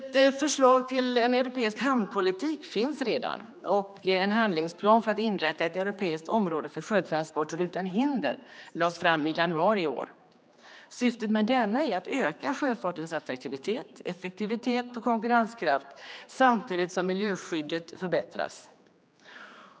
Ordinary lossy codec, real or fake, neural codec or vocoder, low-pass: none; fake; codec, 16 kHz, 2 kbps, X-Codec, HuBERT features, trained on general audio; none